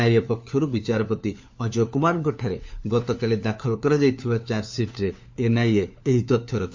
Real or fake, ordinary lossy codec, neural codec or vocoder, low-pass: fake; MP3, 48 kbps; codec, 16 kHz, 4 kbps, FunCodec, trained on LibriTTS, 50 frames a second; 7.2 kHz